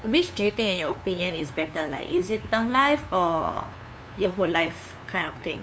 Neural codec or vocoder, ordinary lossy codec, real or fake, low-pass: codec, 16 kHz, 2 kbps, FunCodec, trained on LibriTTS, 25 frames a second; none; fake; none